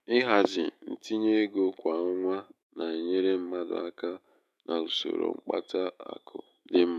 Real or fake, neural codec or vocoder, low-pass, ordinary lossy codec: fake; autoencoder, 48 kHz, 128 numbers a frame, DAC-VAE, trained on Japanese speech; 14.4 kHz; none